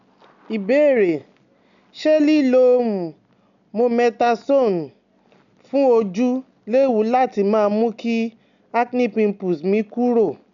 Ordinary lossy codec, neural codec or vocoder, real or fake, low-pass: none; none; real; 7.2 kHz